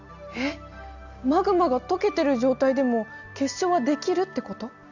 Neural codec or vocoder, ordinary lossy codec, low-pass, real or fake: none; none; 7.2 kHz; real